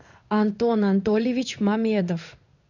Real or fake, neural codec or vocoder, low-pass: fake; codec, 16 kHz in and 24 kHz out, 1 kbps, XY-Tokenizer; 7.2 kHz